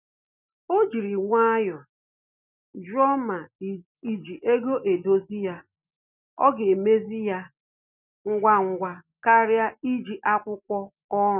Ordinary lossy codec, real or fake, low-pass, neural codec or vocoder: none; real; 3.6 kHz; none